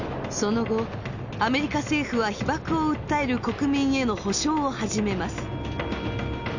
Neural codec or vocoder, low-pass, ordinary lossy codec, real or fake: none; 7.2 kHz; none; real